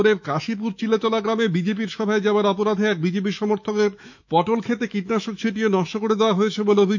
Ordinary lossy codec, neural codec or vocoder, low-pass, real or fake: none; autoencoder, 48 kHz, 128 numbers a frame, DAC-VAE, trained on Japanese speech; 7.2 kHz; fake